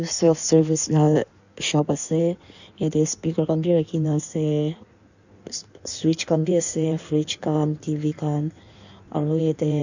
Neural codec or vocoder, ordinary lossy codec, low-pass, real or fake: codec, 16 kHz in and 24 kHz out, 1.1 kbps, FireRedTTS-2 codec; none; 7.2 kHz; fake